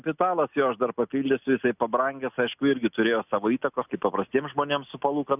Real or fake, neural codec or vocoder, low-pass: real; none; 3.6 kHz